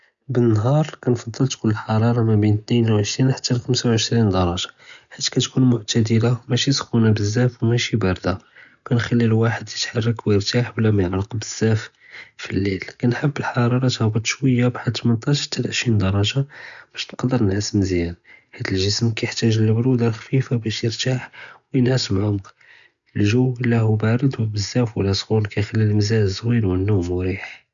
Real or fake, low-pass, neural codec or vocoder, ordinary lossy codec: real; 7.2 kHz; none; none